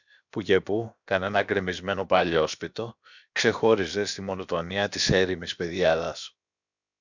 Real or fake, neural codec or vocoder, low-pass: fake; codec, 16 kHz, about 1 kbps, DyCAST, with the encoder's durations; 7.2 kHz